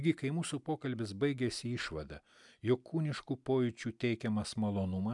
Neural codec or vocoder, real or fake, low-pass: none; real; 10.8 kHz